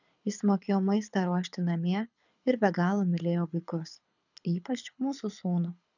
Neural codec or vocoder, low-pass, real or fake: codec, 24 kHz, 6 kbps, HILCodec; 7.2 kHz; fake